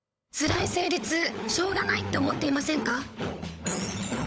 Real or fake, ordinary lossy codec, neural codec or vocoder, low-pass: fake; none; codec, 16 kHz, 16 kbps, FunCodec, trained on LibriTTS, 50 frames a second; none